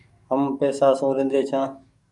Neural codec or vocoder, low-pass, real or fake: codec, 44.1 kHz, 7.8 kbps, DAC; 10.8 kHz; fake